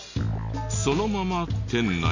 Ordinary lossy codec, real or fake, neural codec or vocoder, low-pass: none; real; none; 7.2 kHz